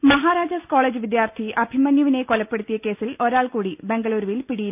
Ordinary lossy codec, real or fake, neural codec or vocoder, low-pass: none; real; none; 3.6 kHz